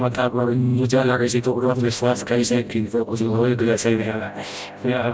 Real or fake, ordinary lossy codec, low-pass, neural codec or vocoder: fake; none; none; codec, 16 kHz, 0.5 kbps, FreqCodec, smaller model